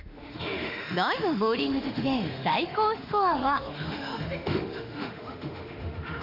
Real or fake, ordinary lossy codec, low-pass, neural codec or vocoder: fake; none; 5.4 kHz; autoencoder, 48 kHz, 32 numbers a frame, DAC-VAE, trained on Japanese speech